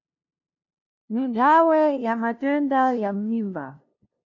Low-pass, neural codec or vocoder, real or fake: 7.2 kHz; codec, 16 kHz, 0.5 kbps, FunCodec, trained on LibriTTS, 25 frames a second; fake